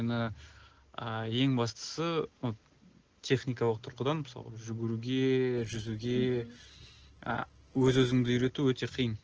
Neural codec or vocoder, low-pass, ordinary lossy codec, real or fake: none; 7.2 kHz; Opus, 16 kbps; real